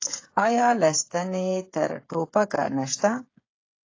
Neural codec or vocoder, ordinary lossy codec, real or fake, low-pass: codec, 16 kHz, 16 kbps, FreqCodec, smaller model; AAC, 32 kbps; fake; 7.2 kHz